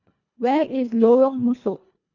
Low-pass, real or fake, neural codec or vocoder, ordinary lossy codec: 7.2 kHz; fake; codec, 24 kHz, 1.5 kbps, HILCodec; none